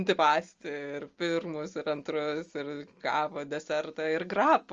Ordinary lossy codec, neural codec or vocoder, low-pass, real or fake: Opus, 32 kbps; none; 7.2 kHz; real